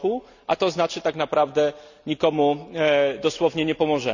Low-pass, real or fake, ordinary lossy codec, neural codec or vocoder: 7.2 kHz; real; none; none